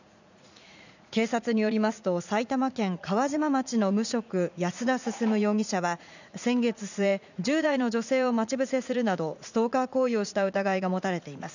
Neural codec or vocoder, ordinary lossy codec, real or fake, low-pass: vocoder, 44.1 kHz, 80 mel bands, Vocos; none; fake; 7.2 kHz